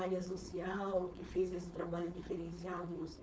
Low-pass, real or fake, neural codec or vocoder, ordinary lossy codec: none; fake; codec, 16 kHz, 4.8 kbps, FACodec; none